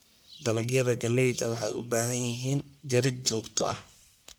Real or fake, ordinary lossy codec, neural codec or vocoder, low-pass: fake; none; codec, 44.1 kHz, 1.7 kbps, Pupu-Codec; none